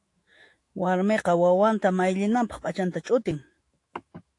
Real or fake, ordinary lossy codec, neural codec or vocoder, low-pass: fake; AAC, 48 kbps; autoencoder, 48 kHz, 128 numbers a frame, DAC-VAE, trained on Japanese speech; 10.8 kHz